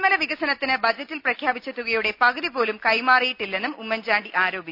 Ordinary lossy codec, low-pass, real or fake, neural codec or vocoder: none; 5.4 kHz; real; none